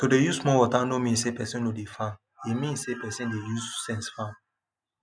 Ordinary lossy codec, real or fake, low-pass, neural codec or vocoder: none; real; 9.9 kHz; none